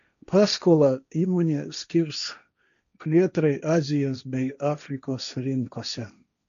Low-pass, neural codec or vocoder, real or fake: 7.2 kHz; codec, 16 kHz, 1.1 kbps, Voila-Tokenizer; fake